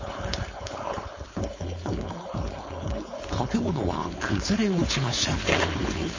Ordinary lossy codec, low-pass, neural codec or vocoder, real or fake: MP3, 32 kbps; 7.2 kHz; codec, 16 kHz, 4.8 kbps, FACodec; fake